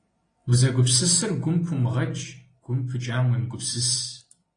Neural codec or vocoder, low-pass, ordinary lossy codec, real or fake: none; 9.9 kHz; AAC, 32 kbps; real